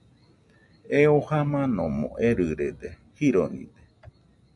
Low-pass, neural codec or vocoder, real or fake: 10.8 kHz; none; real